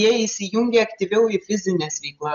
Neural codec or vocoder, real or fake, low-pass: none; real; 7.2 kHz